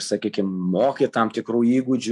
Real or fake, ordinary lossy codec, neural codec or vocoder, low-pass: real; AAC, 64 kbps; none; 10.8 kHz